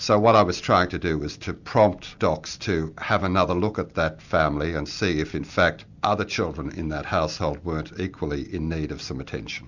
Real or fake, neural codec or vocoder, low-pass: real; none; 7.2 kHz